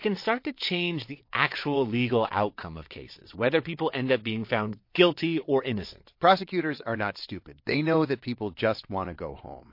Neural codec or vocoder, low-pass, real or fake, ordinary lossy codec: vocoder, 22.05 kHz, 80 mel bands, WaveNeXt; 5.4 kHz; fake; MP3, 32 kbps